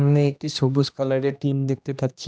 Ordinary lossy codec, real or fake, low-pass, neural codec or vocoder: none; fake; none; codec, 16 kHz, 1 kbps, X-Codec, HuBERT features, trained on general audio